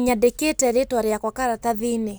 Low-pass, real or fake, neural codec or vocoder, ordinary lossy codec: none; real; none; none